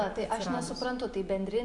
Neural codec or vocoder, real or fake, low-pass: none; real; 10.8 kHz